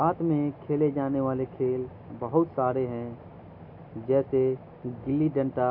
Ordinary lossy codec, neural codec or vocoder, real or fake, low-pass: none; none; real; 5.4 kHz